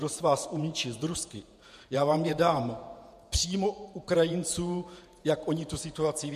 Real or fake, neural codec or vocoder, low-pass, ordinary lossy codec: fake; vocoder, 48 kHz, 128 mel bands, Vocos; 14.4 kHz; MP3, 64 kbps